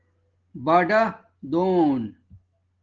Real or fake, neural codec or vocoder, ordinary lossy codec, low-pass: real; none; Opus, 16 kbps; 7.2 kHz